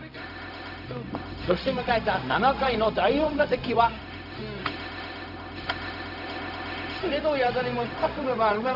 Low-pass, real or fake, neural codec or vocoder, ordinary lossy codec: 5.4 kHz; fake; codec, 16 kHz, 0.4 kbps, LongCat-Audio-Codec; none